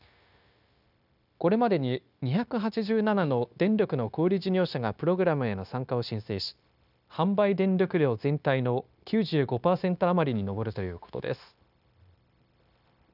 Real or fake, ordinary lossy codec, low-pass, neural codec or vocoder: fake; none; 5.4 kHz; codec, 16 kHz, 0.9 kbps, LongCat-Audio-Codec